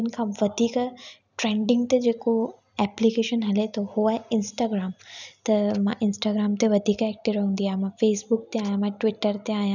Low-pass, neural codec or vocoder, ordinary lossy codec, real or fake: 7.2 kHz; none; none; real